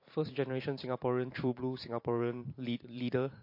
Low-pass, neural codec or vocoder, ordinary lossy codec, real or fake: 5.4 kHz; vocoder, 44.1 kHz, 128 mel bands every 512 samples, BigVGAN v2; MP3, 32 kbps; fake